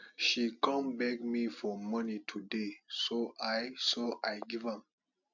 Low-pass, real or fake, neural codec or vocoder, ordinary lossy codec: 7.2 kHz; real; none; none